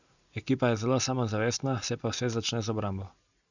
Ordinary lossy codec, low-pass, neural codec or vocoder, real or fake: none; 7.2 kHz; none; real